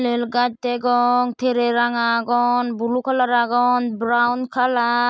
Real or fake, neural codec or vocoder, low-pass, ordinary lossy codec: real; none; none; none